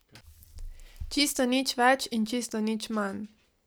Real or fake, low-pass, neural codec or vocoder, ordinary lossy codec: real; none; none; none